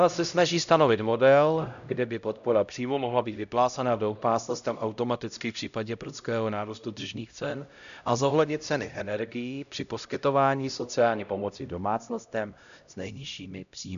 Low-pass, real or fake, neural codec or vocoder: 7.2 kHz; fake; codec, 16 kHz, 0.5 kbps, X-Codec, HuBERT features, trained on LibriSpeech